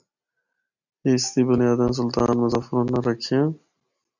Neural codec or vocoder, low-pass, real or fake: none; 7.2 kHz; real